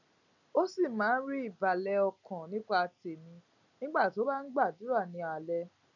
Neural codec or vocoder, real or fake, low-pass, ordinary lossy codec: none; real; 7.2 kHz; none